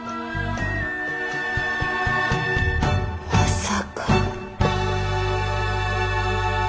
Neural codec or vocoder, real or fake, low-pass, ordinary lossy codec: none; real; none; none